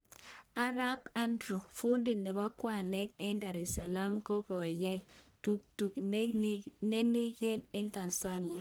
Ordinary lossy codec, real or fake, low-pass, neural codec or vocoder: none; fake; none; codec, 44.1 kHz, 1.7 kbps, Pupu-Codec